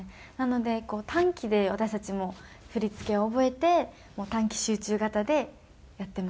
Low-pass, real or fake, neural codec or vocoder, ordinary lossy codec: none; real; none; none